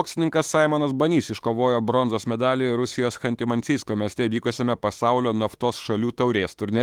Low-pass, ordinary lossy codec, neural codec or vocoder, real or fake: 19.8 kHz; Opus, 24 kbps; autoencoder, 48 kHz, 32 numbers a frame, DAC-VAE, trained on Japanese speech; fake